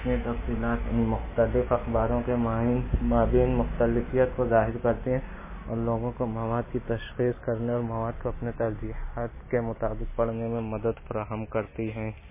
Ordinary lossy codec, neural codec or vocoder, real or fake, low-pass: MP3, 16 kbps; none; real; 3.6 kHz